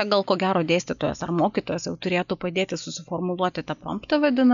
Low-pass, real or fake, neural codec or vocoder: 7.2 kHz; real; none